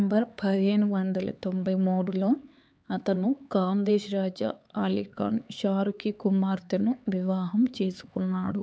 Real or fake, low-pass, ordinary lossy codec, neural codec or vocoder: fake; none; none; codec, 16 kHz, 4 kbps, X-Codec, HuBERT features, trained on LibriSpeech